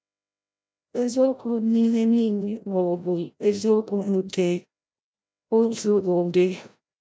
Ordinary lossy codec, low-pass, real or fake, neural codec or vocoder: none; none; fake; codec, 16 kHz, 0.5 kbps, FreqCodec, larger model